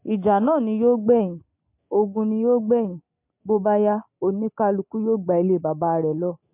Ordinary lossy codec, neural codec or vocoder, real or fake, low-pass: MP3, 32 kbps; none; real; 3.6 kHz